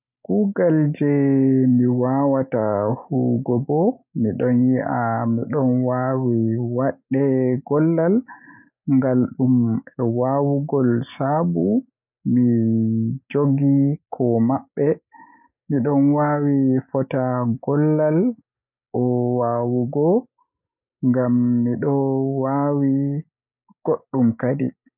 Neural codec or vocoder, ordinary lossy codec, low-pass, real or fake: none; none; 3.6 kHz; real